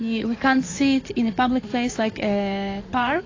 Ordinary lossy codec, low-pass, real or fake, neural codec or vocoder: AAC, 32 kbps; 7.2 kHz; fake; codec, 16 kHz in and 24 kHz out, 2.2 kbps, FireRedTTS-2 codec